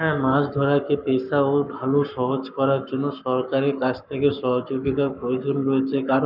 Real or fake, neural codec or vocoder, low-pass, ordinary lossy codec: fake; codec, 44.1 kHz, 7.8 kbps, Pupu-Codec; 5.4 kHz; none